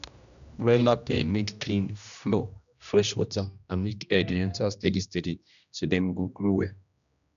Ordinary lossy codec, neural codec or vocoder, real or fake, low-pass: none; codec, 16 kHz, 1 kbps, X-Codec, HuBERT features, trained on general audio; fake; 7.2 kHz